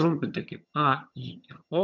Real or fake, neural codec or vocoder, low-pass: fake; vocoder, 22.05 kHz, 80 mel bands, HiFi-GAN; 7.2 kHz